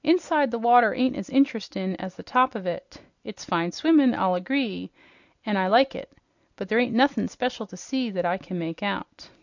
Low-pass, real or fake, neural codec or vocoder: 7.2 kHz; real; none